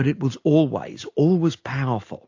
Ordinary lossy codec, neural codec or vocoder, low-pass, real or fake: AAC, 48 kbps; none; 7.2 kHz; real